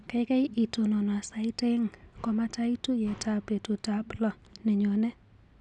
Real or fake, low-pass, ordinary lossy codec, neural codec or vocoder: real; 10.8 kHz; none; none